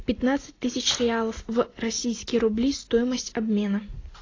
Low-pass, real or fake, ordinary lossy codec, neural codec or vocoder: 7.2 kHz; real; AAC, 32 kbps; none